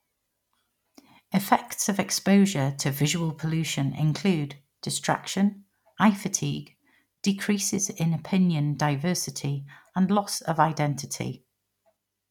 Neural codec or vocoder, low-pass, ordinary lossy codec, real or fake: none; 19.8 kHz; none; real